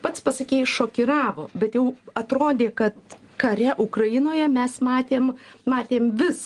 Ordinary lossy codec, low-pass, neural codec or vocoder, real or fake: Opus, 24 kbps; 10.8 kHz; none; real